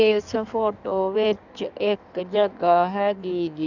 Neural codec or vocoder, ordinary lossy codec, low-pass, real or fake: codec, 16 kHz in and 24 kHz out, 1.1 kbps, FireRedTTS-2 codec; none; 7.2 kHz; fake